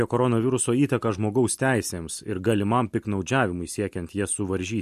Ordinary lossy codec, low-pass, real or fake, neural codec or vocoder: MP3, 64 kbps; 14.4 kHz; real; none